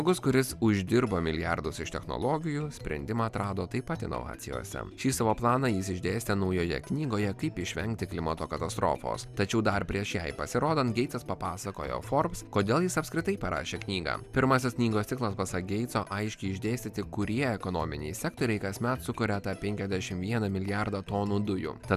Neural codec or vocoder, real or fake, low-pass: none; real; 14.4 kHz